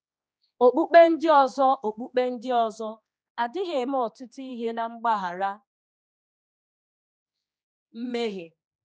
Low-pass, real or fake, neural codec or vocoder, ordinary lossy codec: none; fake; codec, 16 kHz, 2 kbps, X-Codec, HuBERT features, trained on general audio; none